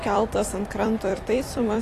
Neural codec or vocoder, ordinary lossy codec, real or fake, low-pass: vocoder, 44.1 kHz, 128 mel bands every 256 samples, BigVGAN v2; AAC, 48 kbps; fake; 14.4 kHz